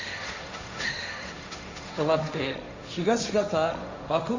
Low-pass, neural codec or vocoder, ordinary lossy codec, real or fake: 7.2 kHz; codec, 16 kHz, 1.1 kbps, Voila-Tokenizer; AAC, 48 kbps; fake